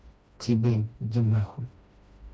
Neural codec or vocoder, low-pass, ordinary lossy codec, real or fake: codec, 16 kHz, 1 kbps, FreqCodec, smaller model; none; none; fake